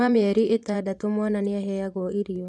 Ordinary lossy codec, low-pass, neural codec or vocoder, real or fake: none; none; vocoder, 24 kHz, 100 mel bands, Vocos; fake